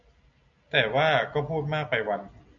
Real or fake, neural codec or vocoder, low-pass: real; none; 7.2 kHz